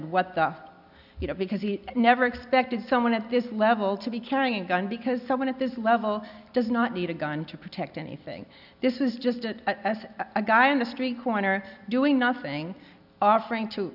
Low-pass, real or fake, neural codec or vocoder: 5.4 kHz; real; none